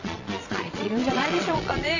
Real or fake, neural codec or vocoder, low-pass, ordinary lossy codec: fake; vocoder, 22.05 kHz, 80 mel bands, Vocos; 7.2 kHz; AAC, 48 kbps